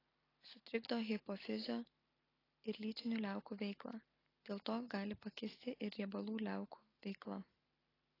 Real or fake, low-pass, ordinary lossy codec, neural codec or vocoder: real; 5.4 kHz; AAC, 24 kbps; none